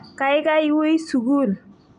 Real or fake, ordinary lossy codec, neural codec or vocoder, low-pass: real; none; none; 9.9 kHz